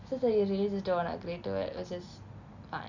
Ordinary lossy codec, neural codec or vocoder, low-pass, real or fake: none; none; 7.2 kHz; real